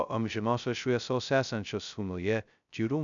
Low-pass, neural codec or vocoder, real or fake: 7.2 kHz; codec, 16 kHz, 0.2 kbps, FocalCodec; fake